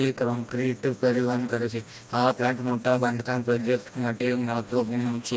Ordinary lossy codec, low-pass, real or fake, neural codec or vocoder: none; none; fake; codec, 16 kHz, 1 kbps, FreqCodec, smaller model